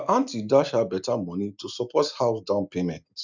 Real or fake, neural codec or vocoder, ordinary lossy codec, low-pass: real; none; none; 7.2 kHz